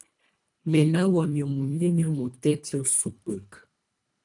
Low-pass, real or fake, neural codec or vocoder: 10.8 kHz; fake; codec, 24 kHz, 1.5 kbps, HILCodec